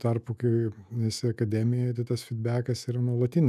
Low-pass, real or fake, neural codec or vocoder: 14.4 kHz; fake; autoencoder, 48 kHz, 128 numbers a frame, DAC-VAE, trained on Japanese speech